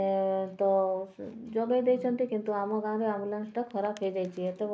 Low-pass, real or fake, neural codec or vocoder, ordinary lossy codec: none; real; none; none